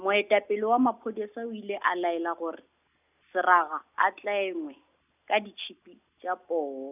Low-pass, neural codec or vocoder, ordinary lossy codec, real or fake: 3.6 kHz; none; none; real